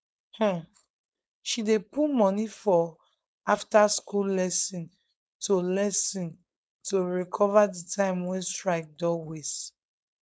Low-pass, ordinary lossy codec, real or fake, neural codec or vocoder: none; none; fake; codec, 16 kHz, 4.8 kbps, FACodec